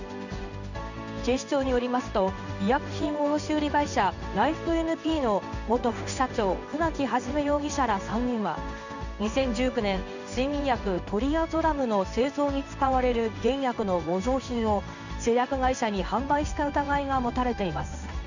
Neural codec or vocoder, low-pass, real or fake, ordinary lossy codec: codec, 16 kHz in and 24 kHz out, 1 kbps, XY-Tokenizer; 7.2 kHz; fake; none